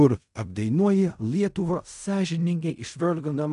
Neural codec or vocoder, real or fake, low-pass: codec, 16 kHz in and 24 kHz out, 0.4 kbps, LongCat-Audio-Codec, fine tuned four codebook decoder; fake; 10.8 kHz